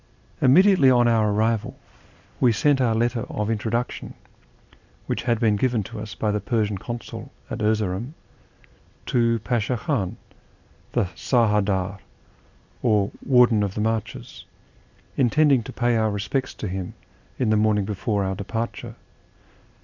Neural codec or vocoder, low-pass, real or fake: none; 7.2 kHz; real